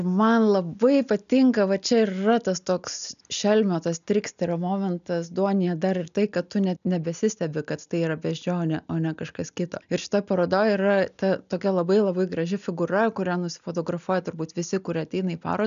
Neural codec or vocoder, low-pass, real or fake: none; 7.2 kHz; real